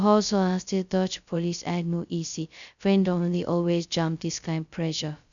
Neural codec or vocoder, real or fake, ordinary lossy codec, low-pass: codec, 16 kHz, 0.2 kbps, FocalCodec; fake; none; 7.2 kHz